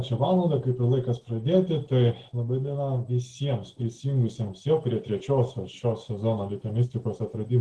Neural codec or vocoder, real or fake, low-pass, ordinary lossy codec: none; real; 10.8 kHz; Opus, 16 kbps